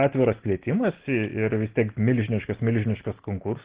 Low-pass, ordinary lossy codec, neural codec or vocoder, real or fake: 3.6 kHz; Opus, 16 kbps; none; real